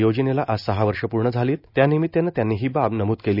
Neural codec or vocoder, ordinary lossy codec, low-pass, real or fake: none; none; 5.4 kHz; real